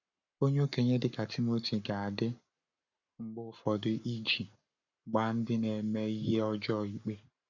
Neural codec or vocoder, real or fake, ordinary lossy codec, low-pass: codec, 44.1 kHz, 7.8 kbps, Pupu-Codec; fake; none; 7.2 kHz